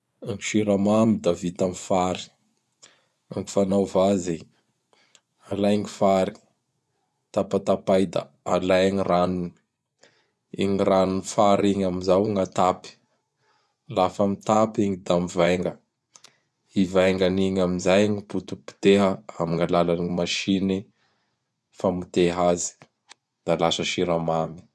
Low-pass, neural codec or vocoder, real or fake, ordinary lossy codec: none; vocoder, 24 kHz, 100 mel bands, Vocos; fake; none